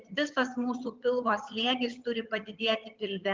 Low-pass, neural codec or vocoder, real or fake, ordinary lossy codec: 7.2 kHz; vocoder, 22.05 kHz, 80 mel bands, HiFi-GAN; fake; Opus, 24 kbps